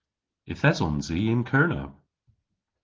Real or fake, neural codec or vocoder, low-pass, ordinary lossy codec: real; none; 7.2 kHz; Opus, 16 kbps